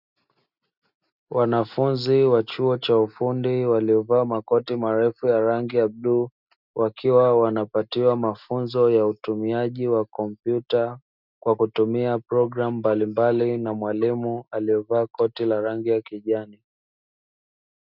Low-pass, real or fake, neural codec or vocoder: 5.4 kHz; real; none